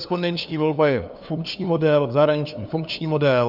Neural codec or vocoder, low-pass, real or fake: codec, 16 kHz, 2 kbps, FunCodec, trained on LibriTTS, 25 frames a second; 5.4 kHz; fake